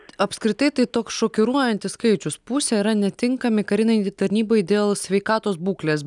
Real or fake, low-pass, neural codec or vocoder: real; 10.8 kHz; none